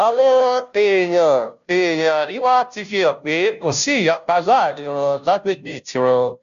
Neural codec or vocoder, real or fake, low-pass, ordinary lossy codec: codec, 16 kHz, 0.5 kbps, FunCodec, trained on Chinese and English, 25 frames a second; fake; 7.2 kHz; AAC, 96 kbps